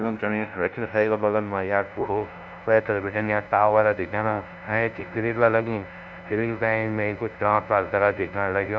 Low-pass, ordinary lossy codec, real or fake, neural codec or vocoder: none; none; fake; codec, 16 kHz, 0.5 kbps, FunCodec, trained on LibriTTS, 25 frames a second